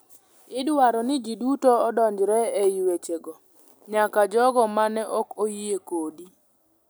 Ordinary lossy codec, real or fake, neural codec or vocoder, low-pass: none; real; none; none